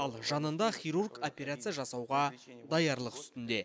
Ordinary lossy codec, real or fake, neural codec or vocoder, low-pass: none; real; none; none